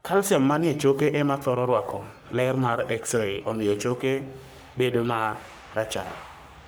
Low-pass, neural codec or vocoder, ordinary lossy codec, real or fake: none; codec, 44.1 kHz, 3.4 kbps, Pupu-Codec; none; fake